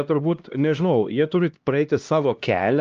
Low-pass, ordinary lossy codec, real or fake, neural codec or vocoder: 7.2 kHz; Opus, 24 kbps; fake; codec, 16 kHz, 1 kbps, X-Codec, HuBERT features, trained on LibriSpeech